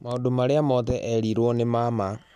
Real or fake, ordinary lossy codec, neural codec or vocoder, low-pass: real; none; none; 14.4 kHz